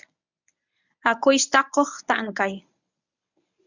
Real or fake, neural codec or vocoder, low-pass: fake; codec, 24 kHz, 0.9 kbps, WavTokenizer, medium speech release version 1; 7.2 kHz